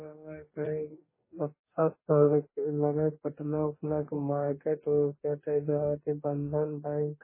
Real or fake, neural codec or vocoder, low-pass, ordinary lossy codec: fake; codec, 44.1 kHz, 2.6 kbps, DAC; 3.6 kHz; MP3, 16 kbps